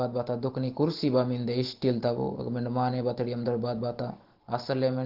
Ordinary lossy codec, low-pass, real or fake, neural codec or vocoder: Opus, 16 kbps; 5.4 kHz; real; none